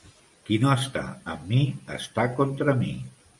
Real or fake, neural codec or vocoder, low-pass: real; none; 10.8 kHz